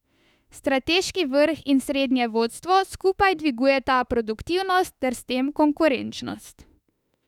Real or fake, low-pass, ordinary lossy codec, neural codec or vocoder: fake; 19.8 kHz; none; autoencoder, 48 kHz, 32 numbers a frame, DAC-VAE, trained on Japanese speech